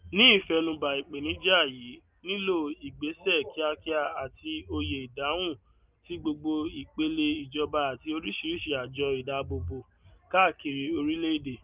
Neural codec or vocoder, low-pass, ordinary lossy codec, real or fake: none; 3.6 kHz; Opus, 24 kbps; real